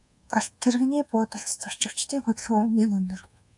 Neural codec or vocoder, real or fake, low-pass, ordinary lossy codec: codec, 24 kHz, 1.2 kbps, DualCodec; fake; 10.8 kHz; AAC, 64 kbps